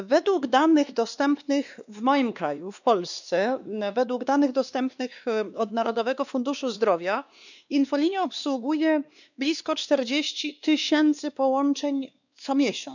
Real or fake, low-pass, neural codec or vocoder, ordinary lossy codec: fake; 7.2 kHz; codec, 16 kHz, 2 kbps, X-Codec, WavLM features, trained on Multilingual LibriSpeech; none